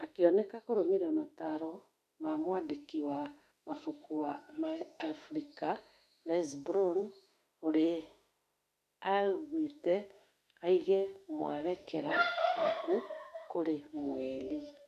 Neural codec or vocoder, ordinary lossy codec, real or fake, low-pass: autoencoder, 48 kHz, 32 numbers a frame, DAC-VAE, trained on Japanese speech; none; fake; 14.4 kHz